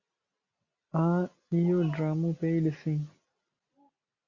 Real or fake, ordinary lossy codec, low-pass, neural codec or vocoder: real; Opus, 64 kbps; 7.2 kHz; none